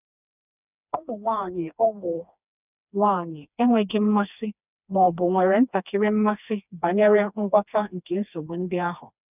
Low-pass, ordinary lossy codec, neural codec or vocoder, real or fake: 3.6 kHz; none; codec, 16 kHz, 2 kbps, FreqCodec, smaller model; fake